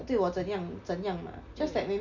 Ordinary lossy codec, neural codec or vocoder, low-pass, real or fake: none; none; 7.2 kHz; real